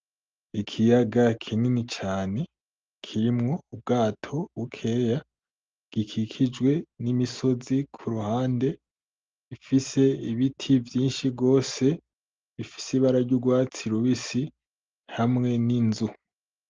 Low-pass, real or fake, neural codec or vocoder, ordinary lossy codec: 7.2 kHz; real; none; Opus, 24 kbps